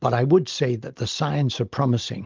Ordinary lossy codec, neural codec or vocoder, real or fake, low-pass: Opus, 32 kbps; none; real; 7.2 kHz